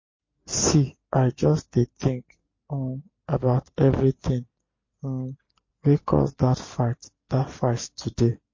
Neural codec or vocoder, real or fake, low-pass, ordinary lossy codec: none; real; 7.2 kHz; MP3, 32 kbps